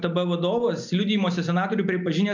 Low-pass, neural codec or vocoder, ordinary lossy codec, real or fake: 7.2 kHz; none; MP3, 64 kbps; real